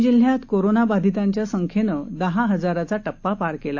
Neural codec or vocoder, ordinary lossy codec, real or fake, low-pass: none; AAC, 48 kbps; real; 7.2 kHz